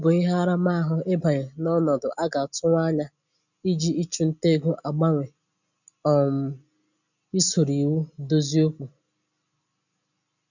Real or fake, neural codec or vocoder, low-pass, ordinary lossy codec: real; none; 7.2 kHz; none